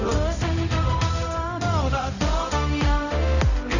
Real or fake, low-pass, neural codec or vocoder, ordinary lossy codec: fake; 7.2 kHz; codec, 16 kHz, 0.5 kbps, X-Codec, HuBERT features, trained on balanced general audio; none